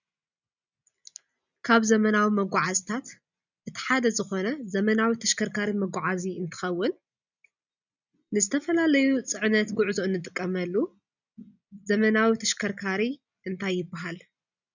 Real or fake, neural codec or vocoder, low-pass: real; none; 7.2 kHz